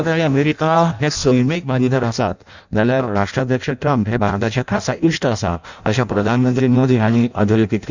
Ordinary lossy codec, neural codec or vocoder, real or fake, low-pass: none; codec, 16 kHz in and 24 kHz out, 0.6 kbps, FireRedTTS-2 codec; fake; 7.2 kHz